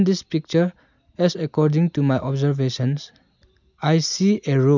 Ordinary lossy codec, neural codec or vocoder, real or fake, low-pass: none; none; real; 7.2 kHz